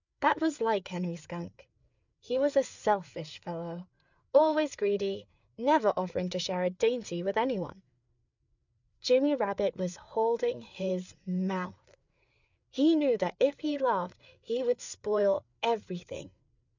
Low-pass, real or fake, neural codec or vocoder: 7.2 kHz; fake; codec, 16 kHz, 4 kbps, FreqCodec, larger model